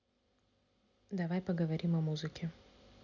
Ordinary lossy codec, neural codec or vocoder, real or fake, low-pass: none; none; real; 7.2 kHz